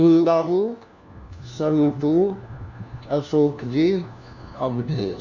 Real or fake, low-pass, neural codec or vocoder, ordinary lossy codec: fake; 7.2 kHz; codec, 16 kHz, 1 kbps, FunCodec, trained on LibriTTS, 50 frames a second; none